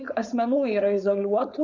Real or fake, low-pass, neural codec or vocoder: fake; 7.2 kHz; codec, 16 kHz, 4.8 kbps, FACodec